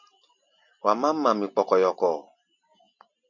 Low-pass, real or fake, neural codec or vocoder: 7.2 kHz; real; none